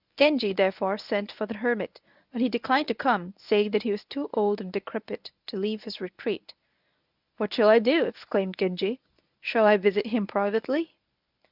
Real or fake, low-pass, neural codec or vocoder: fake; 5.4 kHz; codec, 24 kHz, 0.9 kbps, WavTokenizer, medium speech release version 1